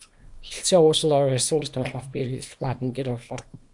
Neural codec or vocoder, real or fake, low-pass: codec, 24 kHz, 0.9 kbps, WavTokenizer, small release; fake; 10.8 kHz